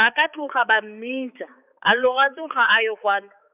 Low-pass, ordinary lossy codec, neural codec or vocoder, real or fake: 3.6 kHz; none; codec, 16 kHz, 4 kbps, X-Codec, HuBERT features, trained on balanced general audio; fake